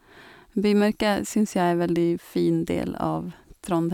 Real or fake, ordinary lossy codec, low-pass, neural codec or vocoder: real; none; 19.8 kHz; none